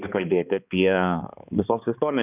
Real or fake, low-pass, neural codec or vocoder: fake; 3.6 kHz; codec, 16 kHz, 2 kbps, X-Codec, HuBERT features, trained on balanced general audio